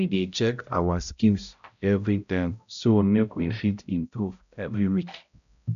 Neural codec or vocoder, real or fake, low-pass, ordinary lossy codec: codec, 16 kHz, 0.5 kbps, X-Codec, HuBERT features, trained on balanced general audio; fake; 7.2 kHz; none